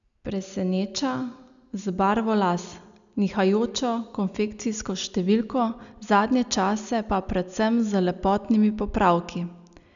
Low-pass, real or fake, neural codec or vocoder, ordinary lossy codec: 7.2 kHz; real; none; none